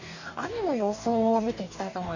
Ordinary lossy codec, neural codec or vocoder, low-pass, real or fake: none; codec, 44.1 kHz, 2.6 kbps, DAC; 7.2 kHz; fake